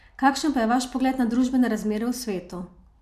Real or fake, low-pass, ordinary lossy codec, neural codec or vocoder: real; 14.4 kHz; MP3, 96 kbps; none